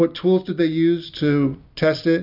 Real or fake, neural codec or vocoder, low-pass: real; none; 5.4 kHz